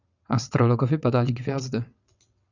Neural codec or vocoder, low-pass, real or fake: vocoder, 22.05 kHz, 80 mel bands, WaveNeXt; 7.2 kHz; fake